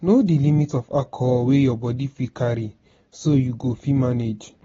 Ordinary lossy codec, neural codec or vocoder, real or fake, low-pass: AAC, 24 kbps; none; real; 7.2 kHz